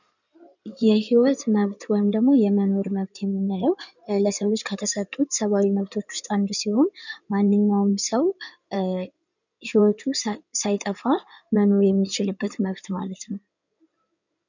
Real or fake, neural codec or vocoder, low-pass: fake; codec, 16 kHz in and 24 kHz out, 2.2 kbps, FireRedTTS-2 codec; 7.2 kHz